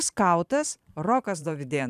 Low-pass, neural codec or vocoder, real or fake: 14.4 kHz; vocoder, 44.1 kHz, 128 mel bands every 512 samples, BigVGAN v2; fake